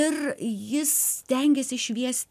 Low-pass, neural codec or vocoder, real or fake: 14.4 kHz; none; real